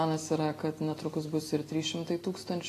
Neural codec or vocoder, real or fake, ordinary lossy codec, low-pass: none; real; AAC, 48 kbps; 14.4 kHz